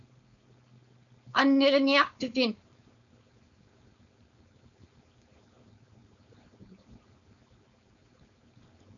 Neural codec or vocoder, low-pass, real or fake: codec, 16 kHz, 4.8 kbps, FACodec; 7.2 kHz; fake